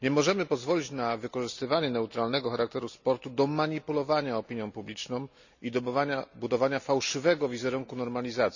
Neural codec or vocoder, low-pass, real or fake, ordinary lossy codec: none; 7.2 kHz; real; none